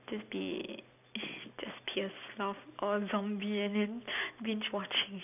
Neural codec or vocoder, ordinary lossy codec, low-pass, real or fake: none; none; 3.6 kHz; real